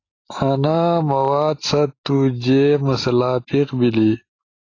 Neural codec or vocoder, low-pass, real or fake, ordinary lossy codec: none; 7.2 kHz; real; AAC, 32 kbps